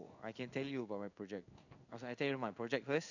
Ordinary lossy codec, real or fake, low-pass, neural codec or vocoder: none; fake; 7.2 kHz; codec, 16 kHz in and 24 kHz out, 1 kbps, XY-Tokenizer